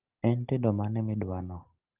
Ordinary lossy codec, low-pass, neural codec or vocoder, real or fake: Opus, 16 kbps; 3.6 kHz; none; real